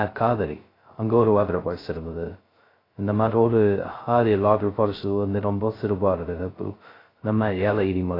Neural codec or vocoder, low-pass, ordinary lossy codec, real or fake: codec, 16 kHz, 0.2 kbps, FocalCodec; 5.4 kHz; AAC, 24 kbps; fake